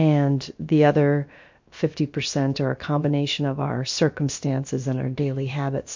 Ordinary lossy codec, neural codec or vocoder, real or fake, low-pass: MP3, 48 kbps; codec, 16 kHz, about 1 kbps, DyCAST, with the encoder's durations; fake; 7.2 kHz